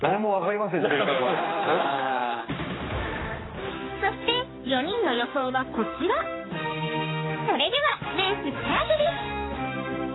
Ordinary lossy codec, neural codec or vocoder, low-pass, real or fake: AAC, 16 kbps; codec, 16 kHz, 2 kbps, X-Codec, HuBERT features, trained on general audio; 7.2 kHz; fake